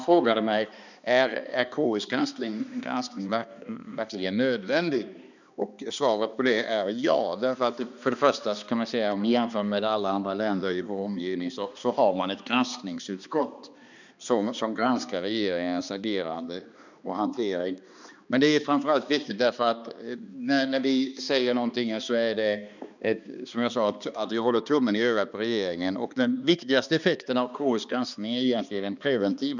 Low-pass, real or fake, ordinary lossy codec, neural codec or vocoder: 7.2 kHz; fake; none; codec, 16 kHz, 2 kbps, X-Codec, HuBERT features, trained on balanced general audio